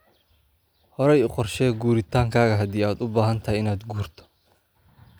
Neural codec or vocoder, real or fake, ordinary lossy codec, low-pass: none; real; none; none